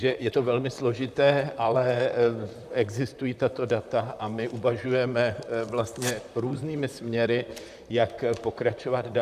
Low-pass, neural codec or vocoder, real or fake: 14.4 kHz; vocoder, 44.1 kHz, 128 mel bands, Pupu-Vocoder; fake